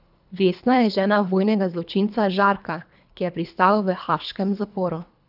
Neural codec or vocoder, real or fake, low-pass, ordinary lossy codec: codec, 24 kHz, 3 kbps, HILCodec; fake; 5.4 kHz; none